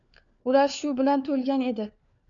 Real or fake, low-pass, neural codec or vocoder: fake; 7.2 kHz; codec, 16 kHz, 4 kbps, FunCodec, trained on LibriTTS, 50 frames a second